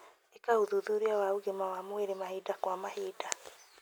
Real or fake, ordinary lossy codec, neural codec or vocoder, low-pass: real; none; none; none